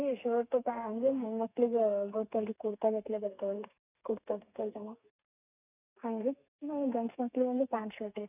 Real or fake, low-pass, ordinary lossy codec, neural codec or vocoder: fake; 3.6 kHz; none; codec, 32 kHz, 1.9 kbps, SNAC